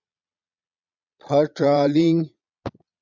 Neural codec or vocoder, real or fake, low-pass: vocoder, 22.05 kHz, 80 mel bands, Vocos; fake; 7.2 kHz